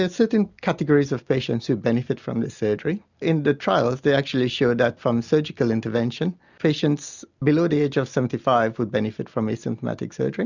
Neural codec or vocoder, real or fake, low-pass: none; real; 7.2 kHz